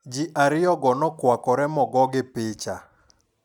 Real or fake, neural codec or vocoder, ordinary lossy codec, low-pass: real; none; none; 19.8 kHz